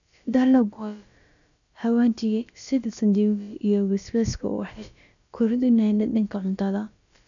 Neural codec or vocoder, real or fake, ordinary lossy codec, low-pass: codec, 16 kHz, about 1 kbps, DyCAST, with the encoder's durations; fake; none; 7.2 kHz